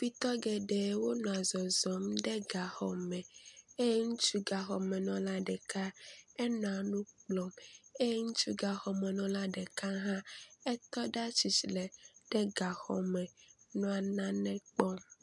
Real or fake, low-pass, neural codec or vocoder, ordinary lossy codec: real; 10.8 kHz; none; MP3, 96 kbps